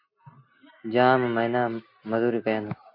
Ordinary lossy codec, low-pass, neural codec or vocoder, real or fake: MP3, 32 kbps; 5.4 kHz; none; real